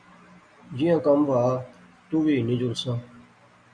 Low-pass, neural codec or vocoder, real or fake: 9.9 kHz; none; real